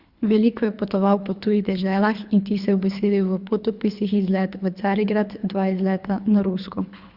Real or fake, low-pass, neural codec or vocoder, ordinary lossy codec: fake; 5.4 kHz; codec, 24 kHz, 3 kbps, HILCodec; Opus, 64 kbps